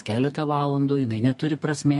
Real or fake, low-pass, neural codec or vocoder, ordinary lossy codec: fake; 14.4 kHz; codec, 32 kHz, 1.9 kbps, SNAC; MP3, 48 kbps